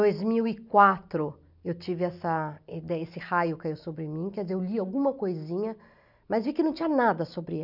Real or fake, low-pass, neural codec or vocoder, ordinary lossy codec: real; 5.4 kHz; none; none